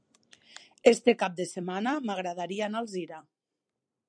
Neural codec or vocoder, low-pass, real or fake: vocoder, 44.1 kHz, 128 mel bands every 256 samples, BigVGAN v2; 9.9 kHz; fake